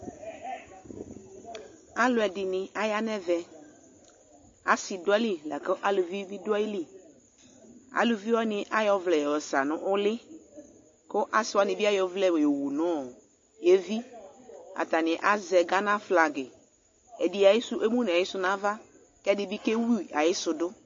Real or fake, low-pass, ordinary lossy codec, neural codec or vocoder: real; 7.2 kHz; MP3, 32 kbps; none